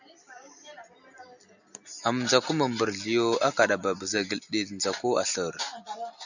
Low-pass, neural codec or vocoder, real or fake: 7.2 kHz; none; real